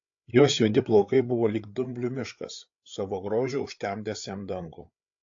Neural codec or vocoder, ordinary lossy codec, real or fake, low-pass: codec, 16 kHz, 8 kbps, FreqCodec, larger model; AAC, 48 kbps; fake; 7.2 kHz